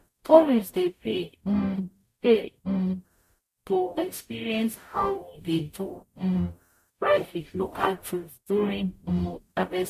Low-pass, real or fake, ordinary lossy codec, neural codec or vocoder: 14.4 kHz; fake; MP3, 64 kbps; codec, 44.1 kHz, 0.9 kbps, DAC